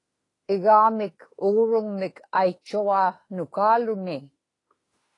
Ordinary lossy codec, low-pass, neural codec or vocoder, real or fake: AAC, 32 kbps; 10.8 kHz; autoencoder, 48 kHz, 32 numbers a frame, DAC-VAE, trained on Japanese speech; fake